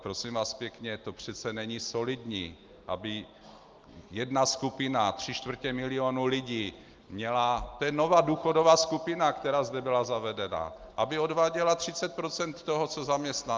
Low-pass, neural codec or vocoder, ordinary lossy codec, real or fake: 7.2 kHz; none; Opus, 32 kbps; real